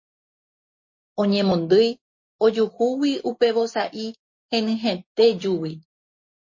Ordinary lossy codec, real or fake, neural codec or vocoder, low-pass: MP3, 32 kbps; real; none; 7.2 kHz